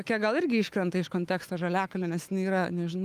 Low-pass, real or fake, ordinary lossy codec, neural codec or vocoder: 14.4 kHz; fake; Opus, 32 kbps; autoencoder, 48 kHz, 128 numbers a frame, DAC-VAE, trained on Japanese speech